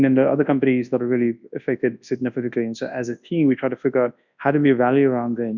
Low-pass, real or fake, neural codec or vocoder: 7.2 kHz; fake; codec, 24 kHz, 0.9 kbps, WavTokenizer, large speech release